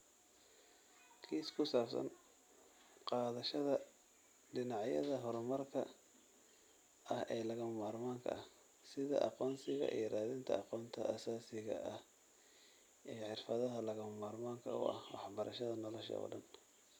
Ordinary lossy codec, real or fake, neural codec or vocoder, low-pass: none; real; none; none